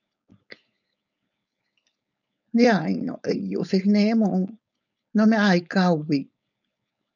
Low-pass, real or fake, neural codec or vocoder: 7.2 kHz; fake; codec, 16 kHz, 4.8 kbps, FACodec